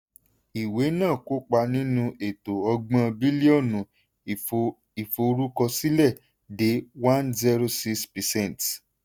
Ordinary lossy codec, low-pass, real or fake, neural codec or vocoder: none; none; real; none